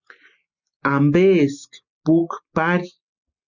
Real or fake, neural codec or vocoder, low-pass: real; none; 7.2 kHz